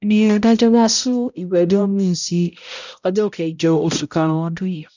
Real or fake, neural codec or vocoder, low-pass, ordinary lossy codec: fake; codec, 16 kHz, 0.5 kbps, X-Codec, HuBERT features, trained on balanced general audio; 7.2 kHz; none